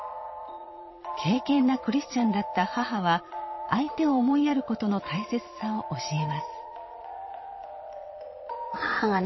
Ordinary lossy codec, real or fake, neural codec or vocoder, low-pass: MP3, 24 kbps; fake; vocoder, 22.05 kHz, 80 mel bands, Vocos; 7.2 kHz